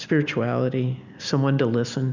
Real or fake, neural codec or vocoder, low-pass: real; none; 7.2 kHz